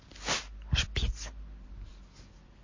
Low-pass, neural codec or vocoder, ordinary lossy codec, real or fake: 7.2 kHz; none; MP3, 32 kbps; real